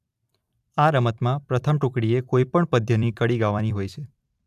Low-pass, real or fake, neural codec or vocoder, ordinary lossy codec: 14.4 kHz; fake; vocoder, 44.1 kHz, 128 mel bands every 256 samples, BigVGAN v2; AAC, 96 kbps